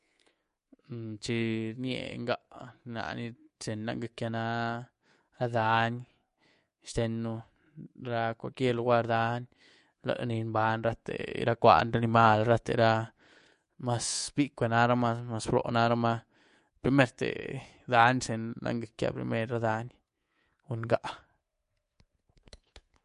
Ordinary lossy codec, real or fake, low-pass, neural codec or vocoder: MP3, 48 kbps; fake; 10.8 kHz; codec, 24 kHz, 3.1 kbps, DualCodec